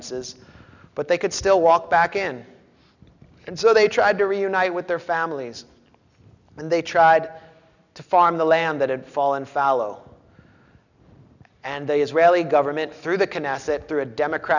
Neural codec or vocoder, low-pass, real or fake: none; 7.2 kHz; real